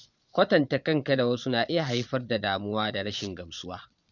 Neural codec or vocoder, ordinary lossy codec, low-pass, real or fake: vocoder, 22.05 kHz, 80 mel bands, Vocos; none; 7.2 kHz; fake